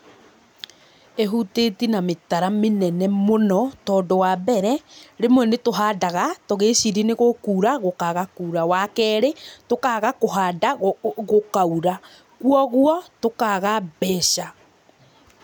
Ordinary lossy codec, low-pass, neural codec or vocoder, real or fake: none; none; none; real